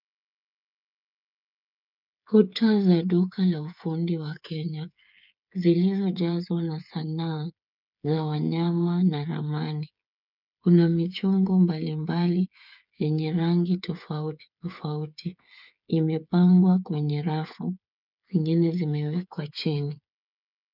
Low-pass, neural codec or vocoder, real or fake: 5.4 kHz; codec, 16 kHz, 8 kbps, FreqCodec, smaller model; fake